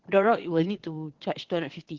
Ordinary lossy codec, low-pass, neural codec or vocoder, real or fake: Opus, 16 kbps; 7.2 kHz; vocoder, 22.05 kHz, 80 mel bands, WaveNeXt; fake